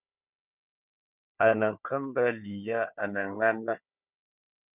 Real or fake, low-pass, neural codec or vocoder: fake; 3.6 kHz; codec, 16 kHz, 4 kbps, FunCodec, trained on Chinese and English, 50 frames a second